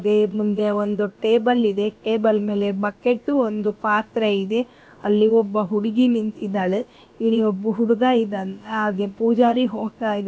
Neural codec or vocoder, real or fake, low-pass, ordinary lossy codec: codec, 16 kHz, about 1 kbps, DyCAST, with the encoder's durations; fake; none; none